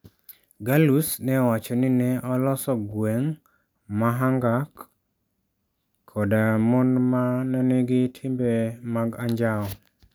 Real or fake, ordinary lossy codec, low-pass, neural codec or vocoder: real; none; none; none